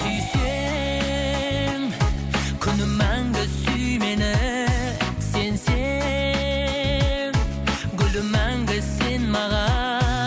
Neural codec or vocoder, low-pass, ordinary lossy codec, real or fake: none; none; none; real